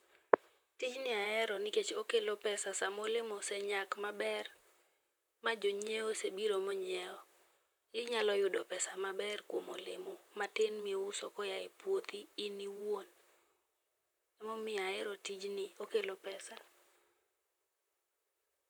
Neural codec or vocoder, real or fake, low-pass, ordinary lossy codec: vocoder, 44.1 kHz, 128 mel bands, Pupu-Vocoder; fake; none; none